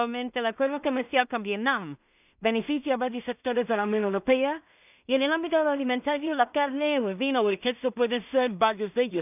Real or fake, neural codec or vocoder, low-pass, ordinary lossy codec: fake; codec, 16 kHz in and 24 kHz out, 0.4 kbps, LongCat-Audio-Codec, two codebook decoder; 3.6 kHz; none